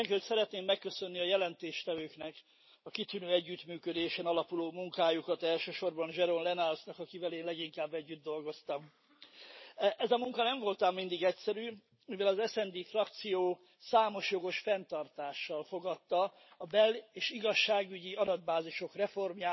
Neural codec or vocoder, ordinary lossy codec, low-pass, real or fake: none; MP3, 24 kbps; 7.2 kHz; real